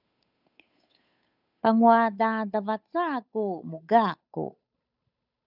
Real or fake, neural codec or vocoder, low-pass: fake; codec, 16 kHz, 8 kbps, FunCodec, trained on Chinese and English, 25 frames a second; 5.4 kHz